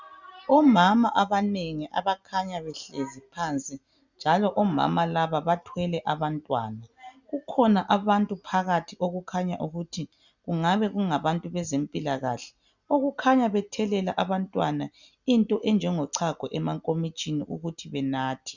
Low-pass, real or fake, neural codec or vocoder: 7.2 kHz; real; none